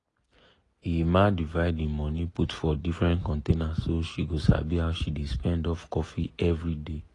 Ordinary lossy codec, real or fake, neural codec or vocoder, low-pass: AAC, 32 kbps; real; none; 10.8 kHz